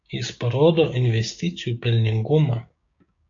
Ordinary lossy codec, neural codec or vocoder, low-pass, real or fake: AAC, 48 kbps; codec, 16 kHz, 6 kbps, DAC; 7.2 kHz; fake